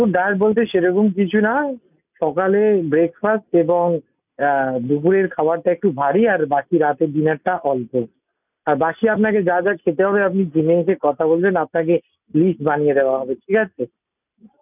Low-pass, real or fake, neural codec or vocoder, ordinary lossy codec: 3.6 kHz; real; none; none